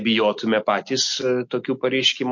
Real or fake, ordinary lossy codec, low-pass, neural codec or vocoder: real; MP3, 48 kbps; 7.2 kHz; none